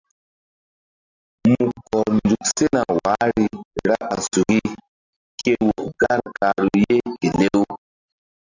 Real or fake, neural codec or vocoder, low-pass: real; none; 7.2 kHz